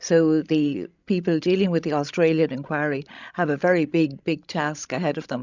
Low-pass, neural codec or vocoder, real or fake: 7.2 kHz; codec, 16 kHz, 16 kbps, FreqCodec, larger model; fake